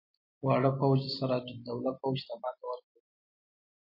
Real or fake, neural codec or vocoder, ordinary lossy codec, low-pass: real; none; MP3, 24 kbps; 5.4 kHz